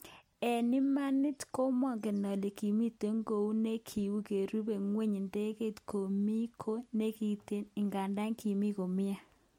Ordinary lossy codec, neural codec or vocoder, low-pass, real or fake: MP3, 64 kbps; none; 19.8 kHz; real